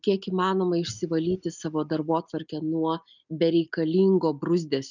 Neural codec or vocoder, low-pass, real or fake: none; 7.2 kHz; real